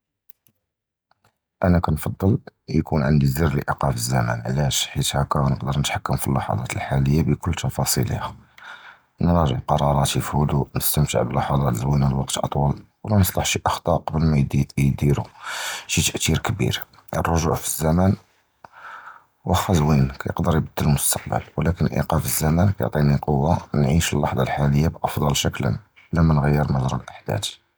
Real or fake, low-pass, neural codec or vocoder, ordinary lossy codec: real; none; none; none